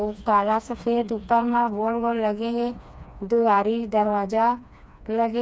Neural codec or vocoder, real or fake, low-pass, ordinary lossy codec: codec, 16 kHz, 2 kbps, FreqCodec, smaller model; fake; none; none